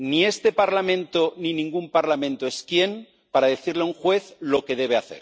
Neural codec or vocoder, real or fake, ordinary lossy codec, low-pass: none; real; none; none